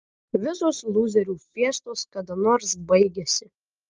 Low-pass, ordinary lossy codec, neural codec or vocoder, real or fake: 7.2 kHz; Opus, 24 kbps; none; real